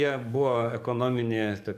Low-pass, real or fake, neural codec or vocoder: 14.4 kHz; fake; codec, 44.1 kHz, 7.8 kbps, DAC